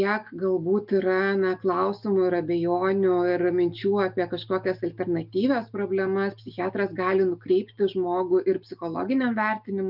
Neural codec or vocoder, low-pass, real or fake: none; 5.4 kHz; real